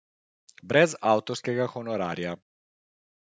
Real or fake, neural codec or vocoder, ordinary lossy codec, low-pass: real; none; none; none